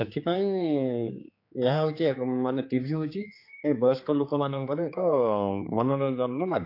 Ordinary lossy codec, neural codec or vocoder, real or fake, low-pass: AAC, 32 kbps; codec, 16 kHz, 4 kbps, X-Codec, HuBERT features, trained on general audio; fake; 5.4 kHz